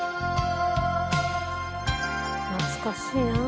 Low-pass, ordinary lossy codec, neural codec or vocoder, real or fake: none; none; none; real